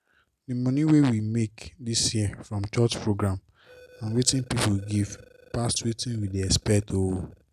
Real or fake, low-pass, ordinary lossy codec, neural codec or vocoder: real; 14.4 kHz; none; none